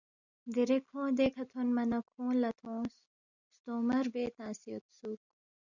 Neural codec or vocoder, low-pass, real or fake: none; 7.2 kHz; real